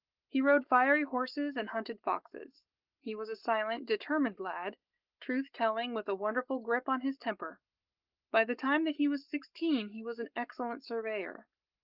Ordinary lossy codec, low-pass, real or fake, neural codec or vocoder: Opus, 32 kbps; 5.4 kHz; fake; codec, 44.1 kHz, 7.8 kbps, Pupu-Codec